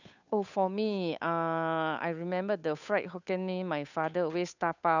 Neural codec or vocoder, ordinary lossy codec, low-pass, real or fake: codec, 16 kHz, 8 kbps, FunCodec, trained on Chinese and English, 25 frames a second; none; 7.2 kHz; fake